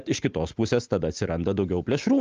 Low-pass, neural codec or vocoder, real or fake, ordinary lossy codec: 7.2 kHz; none; real; Opus, 24 kbps